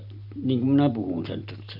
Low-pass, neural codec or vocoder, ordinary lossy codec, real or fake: 5.4 kHz; none; none; real